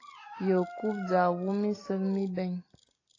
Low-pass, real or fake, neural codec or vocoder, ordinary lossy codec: 7.2 kHz; real; none; AAC, 32 kbps